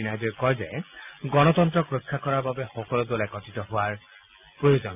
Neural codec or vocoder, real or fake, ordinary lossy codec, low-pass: none; real; AAC, 32 kbps; 3.6 kHz